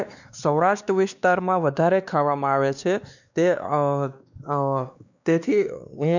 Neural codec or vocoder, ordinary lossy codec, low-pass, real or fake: codec, 16 kHz, 2 kbps, X-Codec, WavLM features, trained on Multilingual LibriSpeech; none; 7.2 kHz; fake